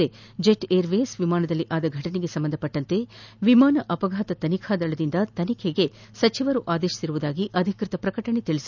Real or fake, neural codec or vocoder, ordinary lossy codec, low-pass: real; none; none; 7.2 kHz